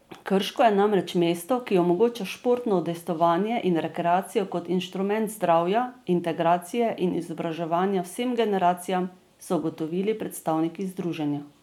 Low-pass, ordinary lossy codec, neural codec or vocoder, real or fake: 19.8 kHz; none; none; real